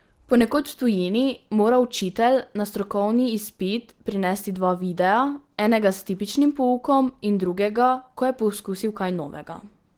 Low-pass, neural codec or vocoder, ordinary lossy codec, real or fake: 19.8 kHz; none; Opus, 16 kbps; real